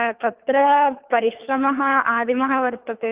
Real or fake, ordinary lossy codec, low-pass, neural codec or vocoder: fake; Opus, 24 kbps; 3.6 kHz; codec, 24 kHz, 3 kbps, HILCodec